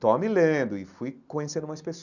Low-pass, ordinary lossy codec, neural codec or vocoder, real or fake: 7.2 kHz; none; none; real